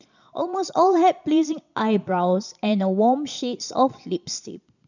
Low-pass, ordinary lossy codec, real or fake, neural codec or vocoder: 7.2 kHz; none; fake; vocoder, 22.05 kHz, 80 mel bands, Vocos